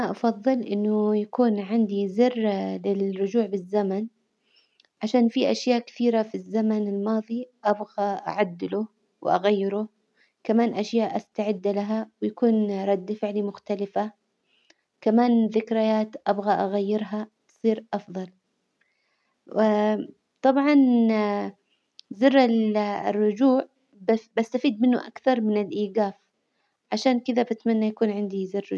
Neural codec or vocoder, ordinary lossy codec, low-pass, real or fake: none; none; none; real